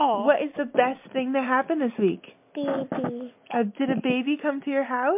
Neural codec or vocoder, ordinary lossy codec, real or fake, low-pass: none; MP3, 32 kbps; real; 3.6 kHz